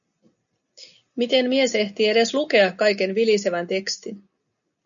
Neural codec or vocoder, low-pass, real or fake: none; 7.2 kHz; real